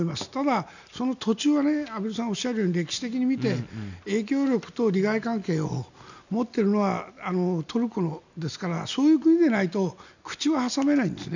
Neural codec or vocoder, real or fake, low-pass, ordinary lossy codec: none; real; 7.2 kHz; none